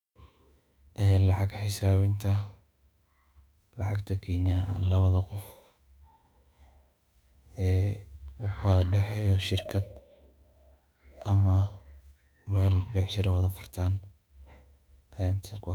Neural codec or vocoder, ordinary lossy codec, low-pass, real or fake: autoencoder, 48 kHz, 32 numbers a frame, DAC-VAE, trained on Japanese speech; none; 19.8 kHz; fake